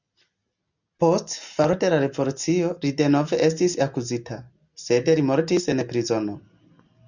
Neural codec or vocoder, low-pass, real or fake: none; 7.2 kHz; real